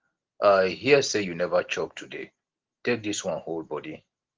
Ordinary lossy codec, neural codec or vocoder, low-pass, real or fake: Opus, 16 kbps; none; 7.2 kHz; real